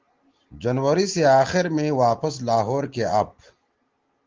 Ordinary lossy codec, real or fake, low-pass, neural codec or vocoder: Opus, 16 kbps; real; 7.2 kHz; none